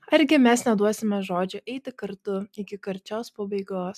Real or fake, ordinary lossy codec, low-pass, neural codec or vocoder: real; MP3, 96 kbps; 14.4 kHz; none